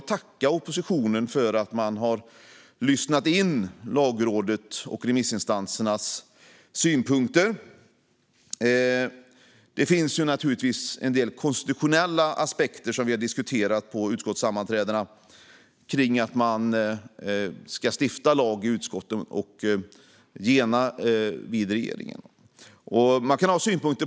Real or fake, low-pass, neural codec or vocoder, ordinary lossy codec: real; none; none; none